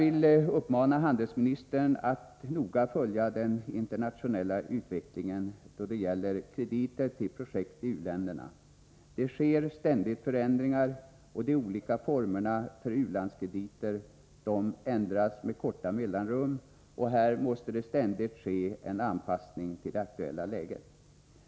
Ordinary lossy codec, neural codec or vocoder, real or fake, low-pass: none; none; real; none